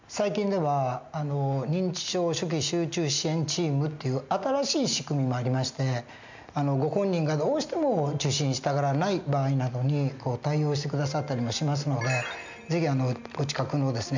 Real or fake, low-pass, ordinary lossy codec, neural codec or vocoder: real; 7.2 kHz; MP3, 64 kbps; none